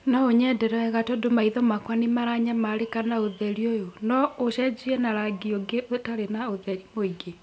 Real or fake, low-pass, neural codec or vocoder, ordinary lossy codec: real; none; none; none